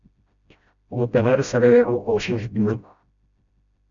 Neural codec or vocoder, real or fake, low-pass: codec, 16 kHz, 0.5 kbps, FreqCodec, smaller model; fake; 7.2 kHz